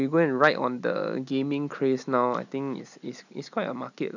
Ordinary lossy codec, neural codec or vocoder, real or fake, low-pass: none; none; real; 7.2 kHz